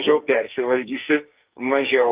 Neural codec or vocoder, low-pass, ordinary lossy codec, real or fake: codec, 44.1 kHz, 2.6 kbps, SNAC; 3.6 kHz; Opus, 24 kbps; fake